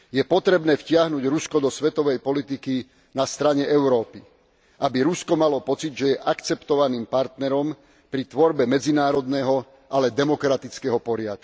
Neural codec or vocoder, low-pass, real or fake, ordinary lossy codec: none; none; real; none